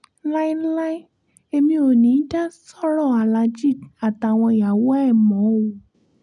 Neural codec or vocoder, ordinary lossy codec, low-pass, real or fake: none; none; 10.8 kHz; real